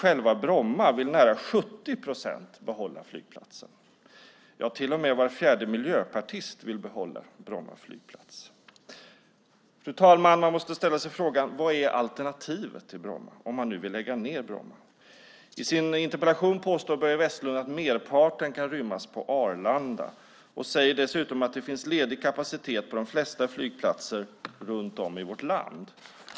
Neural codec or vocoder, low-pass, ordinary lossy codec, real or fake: none; none; none; real